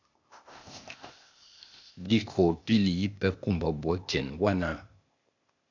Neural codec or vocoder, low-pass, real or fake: codec, 16 kHz, 0.8 kbps, ZipCodec; 7.2 kHz; fake